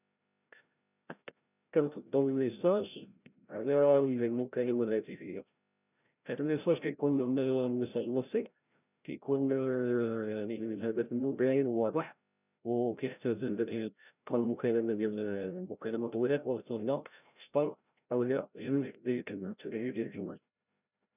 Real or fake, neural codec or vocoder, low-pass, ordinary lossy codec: fake; codec, 16 kHz, 0.5 kbps, FreqCodec, larger model; 3.6 kHz; none